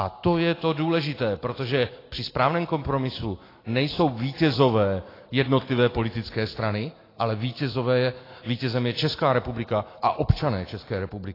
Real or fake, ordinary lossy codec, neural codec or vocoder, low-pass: real; AAC, 24 kbps; none; 5.4 kHz